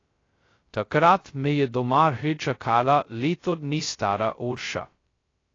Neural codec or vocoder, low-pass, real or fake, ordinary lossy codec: codec, 16 kHz, 0.2 kbps, FocalCodec; 7.2 kHz; fake; AAC, 32 kbps